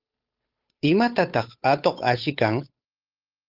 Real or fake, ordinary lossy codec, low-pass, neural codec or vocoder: fake; Opus, 32 kbps; 5.4 kHz; codec, 16 kHz, 8 kbps, FunCodec, trained on Chinese and English, 25 frames a second